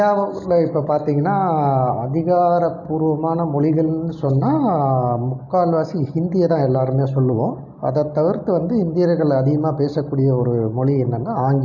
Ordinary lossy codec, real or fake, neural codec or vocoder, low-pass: none; real; none; 7.2 kHz